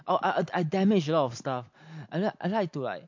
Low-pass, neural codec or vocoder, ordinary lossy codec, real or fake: 7.2 kHz; none; MP3, 48 kbps; real